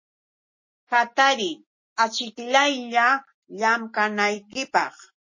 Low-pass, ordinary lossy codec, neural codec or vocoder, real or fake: 7.2 kHz; MP3, 32 kbps; none; real